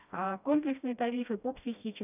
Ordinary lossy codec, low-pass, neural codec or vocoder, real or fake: none; 3.6 kHz; codec, 16 kHz, 1 kbps, FreqCodec, smaller model; fake